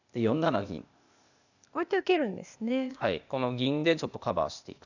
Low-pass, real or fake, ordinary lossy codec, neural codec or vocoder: 7.2 kHz; fake; none; codec, 16 kHz, 0.8 kbps, ZipCodec